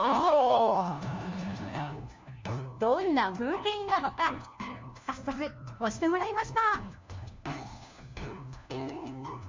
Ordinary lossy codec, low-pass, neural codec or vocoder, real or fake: MP3, 64 kbps; 7.2 kHz; codec, 16 kHz, 1 kbps, FunCodec, trained on LibriTTS, 50 frames a second; fake